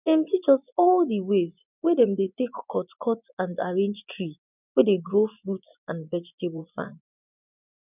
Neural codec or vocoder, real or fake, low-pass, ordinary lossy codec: vocoder, 24 kHz, 100 mel bands, Vocos; fake; 3.6 kHz; none